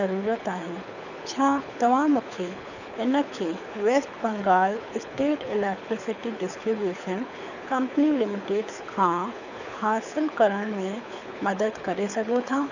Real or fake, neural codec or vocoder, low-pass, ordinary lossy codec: fake; codec, 24 kHz, 6 kbps, HILCodec; 7.2 kHz; none